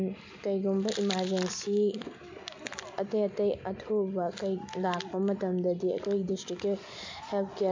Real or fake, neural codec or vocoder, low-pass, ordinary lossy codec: real; none; 7.2 kHz; MP3, 48 kbps